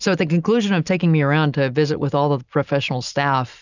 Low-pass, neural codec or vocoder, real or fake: 7.2 kHz; none; real